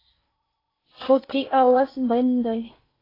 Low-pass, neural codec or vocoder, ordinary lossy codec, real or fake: 5.4 kHz; codec, 16 kHz in and 24 kHz out, 0.6 kbps, FocalCodec, streaming, 4096 codes; AAC, 24 kbps; fake